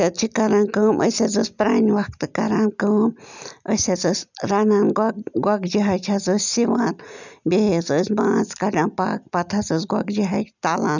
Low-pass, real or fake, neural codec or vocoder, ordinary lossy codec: 7.2 kHz; real; none; none